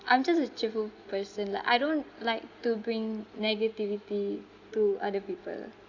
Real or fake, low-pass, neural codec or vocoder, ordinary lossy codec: real; 7.2 kHz; none; Opus, 64 kbps